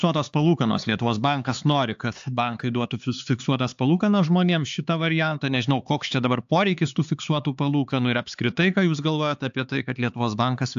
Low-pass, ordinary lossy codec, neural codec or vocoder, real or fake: 7.2 kHz; AAC, 64 kbps; codec, 16 kHz, 4 kbps, X-Codec, HuBERT features, trained on LibriSpeech; fake